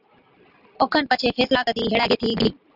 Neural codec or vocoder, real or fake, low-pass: none; real; 5.4 kHz